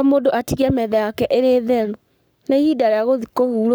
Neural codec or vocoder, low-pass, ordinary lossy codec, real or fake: codec, 44.1 kHz, 7.8 kbps, Pupu-Codec; none; none; fake